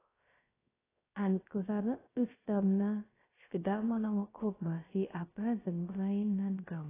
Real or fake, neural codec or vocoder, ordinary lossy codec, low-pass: fake; codec, 16 kHz, 0.3 kbps, FocalCodec; AAC, 16 kbps; 3.6 kHz